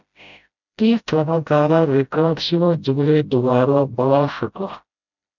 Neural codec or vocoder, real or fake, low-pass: codec, 16 kHz, 0.5 kbps, FreqCodec, smaller model; fake; 7.2 kHz